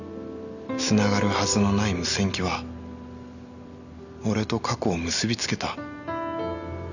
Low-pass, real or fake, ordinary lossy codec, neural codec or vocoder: 7.2 kHz; real; none; none